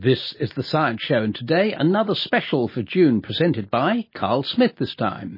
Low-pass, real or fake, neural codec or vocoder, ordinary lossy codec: 5.4 kHz; real; none; MP3, 24 kbps